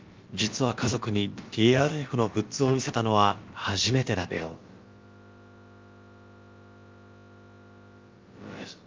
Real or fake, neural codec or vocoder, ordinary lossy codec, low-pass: fake; codec, 16 kHz, about 1 kbps, DyCAST, with the encoder's durations; Opus, 24 kbps; 7.2 kHz